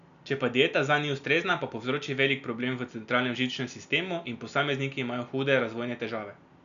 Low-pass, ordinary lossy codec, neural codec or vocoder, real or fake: 7.2 kHz; none; none; real